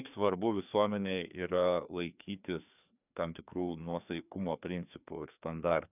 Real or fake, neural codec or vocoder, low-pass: fake; codec, 16 kHz, 2 kbps, FreqCodec, larger model; 3.6 kHz